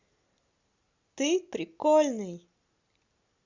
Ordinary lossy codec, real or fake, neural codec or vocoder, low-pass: Opus, 64 kbps; real; none; 7.2 kHz